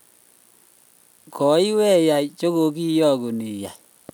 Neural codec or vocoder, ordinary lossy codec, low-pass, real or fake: none; none; none; real